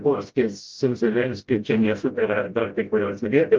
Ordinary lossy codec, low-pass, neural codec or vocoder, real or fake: Opus, 24 kbps; 7.2 kHz; codec, 16 kHz, 0.5 kbps, FreqCodec, smaller model; fake